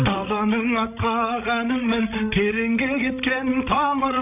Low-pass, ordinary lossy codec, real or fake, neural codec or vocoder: 3.6 kHz; none; fake; codec, 16 kHz, 16 kbps, FreqCodec, larger model